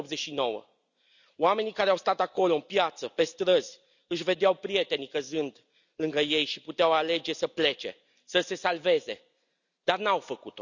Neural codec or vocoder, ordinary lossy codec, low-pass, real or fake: none; none; 7.2 kHz; real